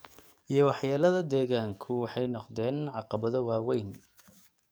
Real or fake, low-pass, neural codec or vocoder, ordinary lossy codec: fake; none; codec, 44.1 kHz, 7.8 kbps, DAC; none